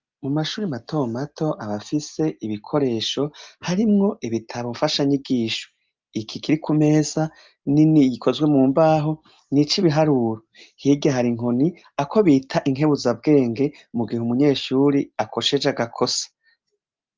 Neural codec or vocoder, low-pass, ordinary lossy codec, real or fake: none; 7.2 kHz; Opus, 24 kbps; real